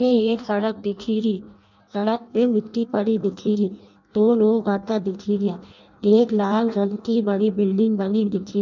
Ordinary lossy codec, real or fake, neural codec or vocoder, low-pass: none; fake; codec, 16 kHz in and 24 kHz out, 0.6 kbps, FireRedTTS-2 codec; 7.2 kHz